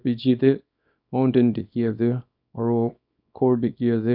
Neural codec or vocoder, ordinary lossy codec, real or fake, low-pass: codec, 24 kHz, 0.9 kbps, WavTokenizer, small release; none; fake; 5.4 kHz